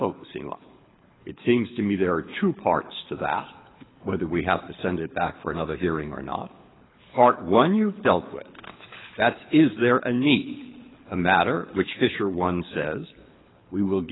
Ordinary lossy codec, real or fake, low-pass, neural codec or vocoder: AAC, 16 kbps; fake; 7.2 kHz; codec, 24 kHz, 6 kbps, HILCodec